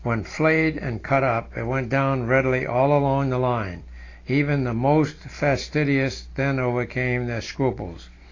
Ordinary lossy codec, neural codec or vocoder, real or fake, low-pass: AAC, 32 kbps; none; real; 7.2 kHz